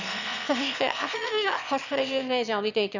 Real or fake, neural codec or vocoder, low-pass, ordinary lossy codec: fake; autoencoder, 22.05 kHz, a latent of 192 numbers a frame, VITS, trained on one speaker; 7.2 kHz; none